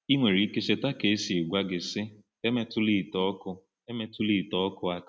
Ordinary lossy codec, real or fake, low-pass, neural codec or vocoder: none; real; none; none